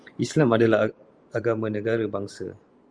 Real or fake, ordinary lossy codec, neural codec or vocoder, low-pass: fake; Opus, 32 kbps; vocoder, 44.1 kHz, 128 mel bands every 512 samples, BigVGAN v2; 9.9 kHz